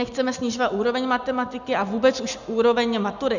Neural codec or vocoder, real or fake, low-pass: none; real; 7.2 kHz